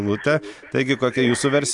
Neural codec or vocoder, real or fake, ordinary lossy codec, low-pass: none; real; MP3, 64 kbps; 10.8 kHz